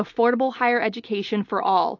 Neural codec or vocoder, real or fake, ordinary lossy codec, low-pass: none; real; AAC, 48 kbps; 7.2 kHz